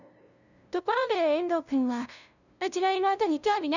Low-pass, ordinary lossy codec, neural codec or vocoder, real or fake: 7.2 kHz; none; codec, 16 kHz, 0.5 kbps, FunCodec, trained on LibriTTS, 25 frames a second; fake